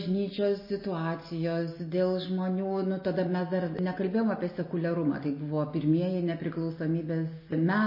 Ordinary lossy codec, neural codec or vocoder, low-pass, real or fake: MP3, 24 kbps; none; 5.4 kHz; real